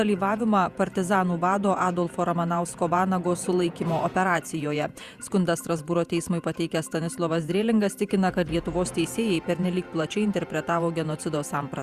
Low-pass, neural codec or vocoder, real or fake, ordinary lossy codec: 14.4 kHz; vocoder, 48 kHz, 128 mel bands, Vocos; fake; Opus, 64 kbps